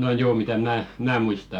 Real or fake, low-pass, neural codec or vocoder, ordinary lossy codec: fake; 19.8 kHz; autoencoder, 48 kHz, 128 numbers a frame, DAC-VAE, trained on Japanese speech; none